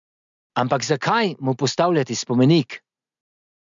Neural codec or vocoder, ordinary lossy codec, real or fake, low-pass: none; MP3, 64 kbps; real; 7.2 kHz